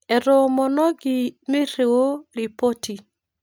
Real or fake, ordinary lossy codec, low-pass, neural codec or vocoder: real; none; none; none